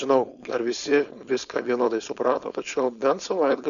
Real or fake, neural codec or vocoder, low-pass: fake; codec, 16 kHz, 4.8 kbps, FACodec; 7.2 kHz